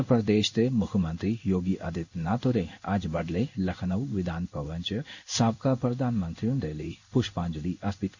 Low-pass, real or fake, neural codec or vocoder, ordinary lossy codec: 7.2 kHz; fake; codec, 16 kHz in and 24 kHz out, 1 kbps, XY-Tokenizer; none